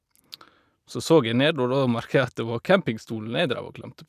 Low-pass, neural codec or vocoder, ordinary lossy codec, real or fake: 14.4 kHz; none; none; real